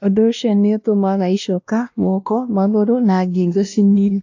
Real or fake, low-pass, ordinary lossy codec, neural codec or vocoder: fake; 7.2 kHz; AAC, 48 kbps; codec, 16 kHz, 1 kbps, X-Codec, WavLM features, trained on Multilingual LibriSpeech